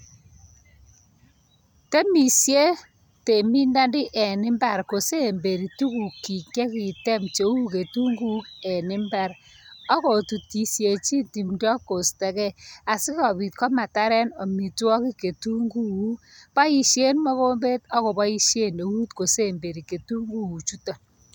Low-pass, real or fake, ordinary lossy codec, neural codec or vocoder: none; real; none; none